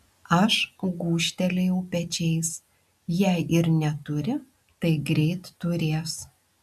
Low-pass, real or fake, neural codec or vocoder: 14.4 kHz; real; none